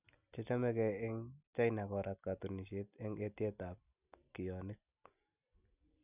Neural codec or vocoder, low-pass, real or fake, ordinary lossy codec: none; 3.6 kHz; real; none